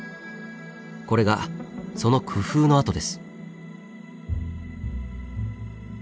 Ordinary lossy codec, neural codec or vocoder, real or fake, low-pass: none; none; real; none